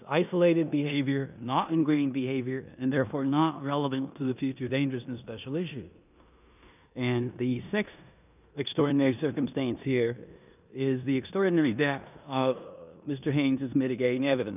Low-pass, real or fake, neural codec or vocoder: 3.6 kHz; fake; codec, 16 kHz in and 24 kHz out, 0.9 kbps, LongCat-Audio-Codec, four codebook decoder